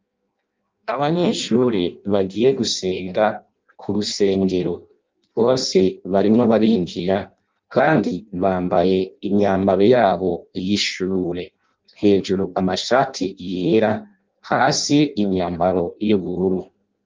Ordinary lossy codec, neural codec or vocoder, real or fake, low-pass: Opus, 24 kbps; codec, 16 kHz in and 24 kHz out, 0.6 kbps, FireRedTTS-2 codec; fake; 7.2 kHz